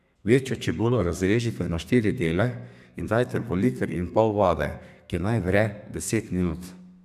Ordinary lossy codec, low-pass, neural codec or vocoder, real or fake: none; 14.4 kHz; codec, 32 kHz, 1.9 kbps, SNAC; fake